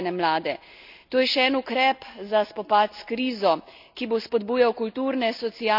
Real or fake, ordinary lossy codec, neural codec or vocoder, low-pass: real; none; none; 5.4 kHz